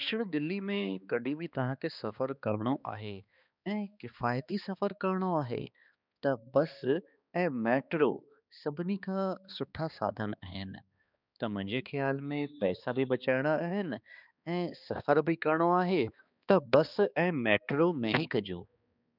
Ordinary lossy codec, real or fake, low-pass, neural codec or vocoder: none; fake; 5.4 kHz; codec, 16 kHz, 2 kbps, X-Codec, HuBERT features, trained on balanced general audio